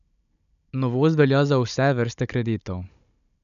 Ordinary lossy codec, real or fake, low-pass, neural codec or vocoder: none; fake; 7.2 kHz; codec, 16 kHz, 16 kbps, FunCodec, trained on Chinese and English, 50 frames a second